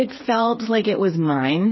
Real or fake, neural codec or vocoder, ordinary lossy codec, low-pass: fake; codec, 16 kHz, 4 kbps, FreqCodec, smaller model; MP3, 24 kbps; 7.2 kHz